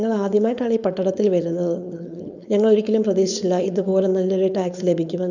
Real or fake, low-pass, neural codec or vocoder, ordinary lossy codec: fake; 7.2 kHz; codec, 16 kHz, 4.8 kbps, FACodec; none